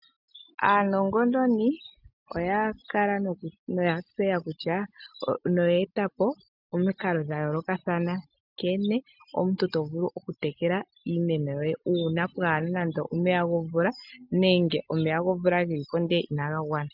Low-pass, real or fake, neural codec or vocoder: 5.4 kHz; real; none